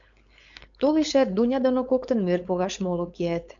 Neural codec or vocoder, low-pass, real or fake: codec, 16 kHz, 4.8 kbps, FACodec; 7.2 kHz; fake